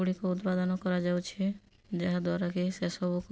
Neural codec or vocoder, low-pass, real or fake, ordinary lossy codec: none; none; real; none